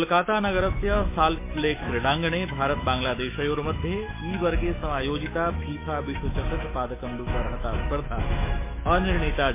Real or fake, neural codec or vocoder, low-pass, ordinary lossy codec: fake; autoencoder, 48 kHz, 128 numbers a frame, DAC-VAE, trained on Japanese speech; 3.6 kHz; MP3, 24 kbps